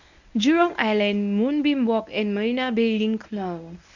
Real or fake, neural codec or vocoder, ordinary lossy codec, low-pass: fake; codec, 24 kHz, 0.9 kbps, WavTokenizer, medium speech release version 1; none; 7.2 kHz